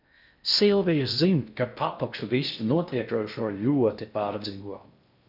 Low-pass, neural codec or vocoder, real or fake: 5.4 kHz; codec, 16 kHz in and 24 kHz out, 0.6 kbps, FocalCodec, streaming, 2048 codes; fake